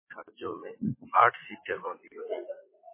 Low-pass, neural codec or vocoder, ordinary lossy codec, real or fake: 3.6 kHz; vocoder, 44.1 kHz, 80 mel bands, Vocos; MP3, 16 kbps; fake